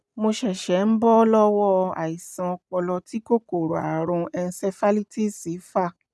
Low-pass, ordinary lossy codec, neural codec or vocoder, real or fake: none; none; none; real